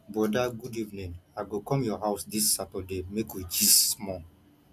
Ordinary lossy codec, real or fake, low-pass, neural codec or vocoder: none; real; 14.4 kHz; none